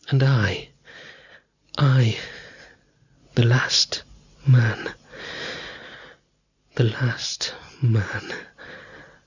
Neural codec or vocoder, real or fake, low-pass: none; real; 7.2 kHz